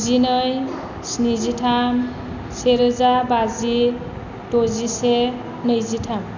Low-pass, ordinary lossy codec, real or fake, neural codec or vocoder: 7.2 kHz; none; real; none